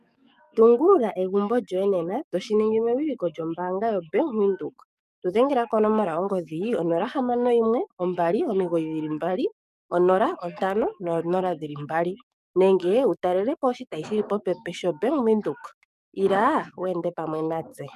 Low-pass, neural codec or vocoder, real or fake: 14.4 kHz; codec, 44.1 kHz, 7.8 kbps, DAC; fake